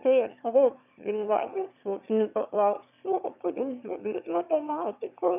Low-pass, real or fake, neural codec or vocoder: 3.6 kHz; fake; autoencoder, 22.05 kHz, a latent of 192 numbers a frame, VITS, trained on one speaker